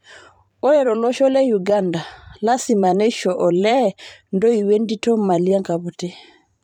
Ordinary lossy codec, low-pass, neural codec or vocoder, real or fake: none; 19.8 kHz; vocoder, 44.1 kHz, 128 mel bands every 512 samples, BigVGAN v2; fake